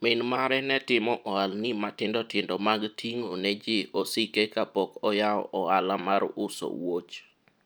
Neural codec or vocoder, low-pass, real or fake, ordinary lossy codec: none; none; real; none